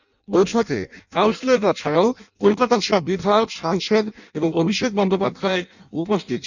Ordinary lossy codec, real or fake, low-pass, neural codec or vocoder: none; fake; 7.2 kHz; codec, 16 kHz in and 24 kHz out, 0.6 kbps, FireRedTTS-2 codec